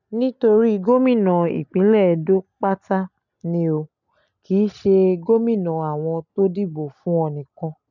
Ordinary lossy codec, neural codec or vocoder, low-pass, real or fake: none; none; 7.2 kHz; real